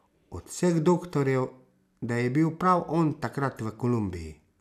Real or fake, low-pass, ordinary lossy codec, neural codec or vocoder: real; 14.4 kHz; none; none